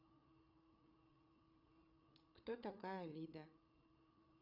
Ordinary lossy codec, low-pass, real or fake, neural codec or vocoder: none; 5.4 kHz; fake; codec, 16 kHz, 8 kbps, FreqCodec, larger model